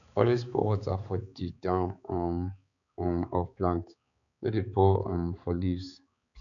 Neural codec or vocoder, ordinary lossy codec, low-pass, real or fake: codec, 16 kHz, 4 kbps, X-Codec, HuBERT features, trained on balanced general audio; none; 7.2 kHz; fake